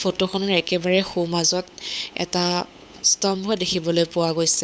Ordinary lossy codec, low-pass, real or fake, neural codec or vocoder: none; none; fake; codec, 16 kHz, 8 kbps, FunCodec, trained on LibriTTS, 25 frames a second